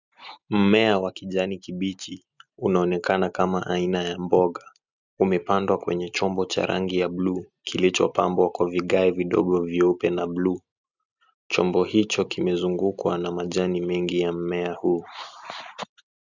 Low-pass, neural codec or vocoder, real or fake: 7.2 kHz; none; real